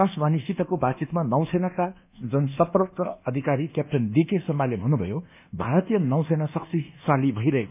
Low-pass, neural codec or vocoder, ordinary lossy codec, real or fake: 3.6 kHz; codec, 24 kHz, 1.2 kbps, DualCodec; none; fake